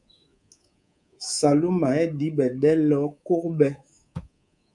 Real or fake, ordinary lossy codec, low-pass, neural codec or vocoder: fake; MP3, 96 kbps; 10.8 kHz; codec, 24 kHz, 3.1 kbps, DualCodec